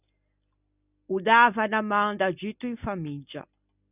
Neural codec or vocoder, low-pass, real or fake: none; 3.6 kHz; real